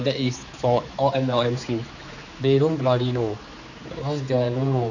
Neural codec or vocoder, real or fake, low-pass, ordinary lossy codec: codec, 16 kHz, 4 kbps, X-Codec, HuBERT features, trained on general audio; fake; 7.2 kHz; none